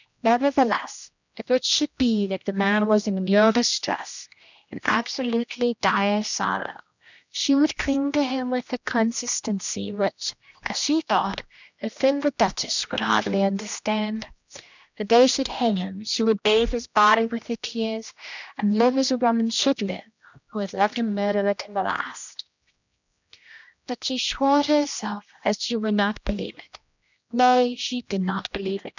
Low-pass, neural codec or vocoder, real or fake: 7.2 kHz; codec, 16 kHz, 1 kbps, X-Codec, HuBERT features, trained on general audio; fake